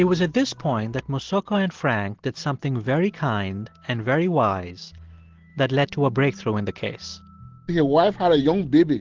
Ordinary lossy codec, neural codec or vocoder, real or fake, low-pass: Opus, 16 kbps; none; real; 7.2 kHz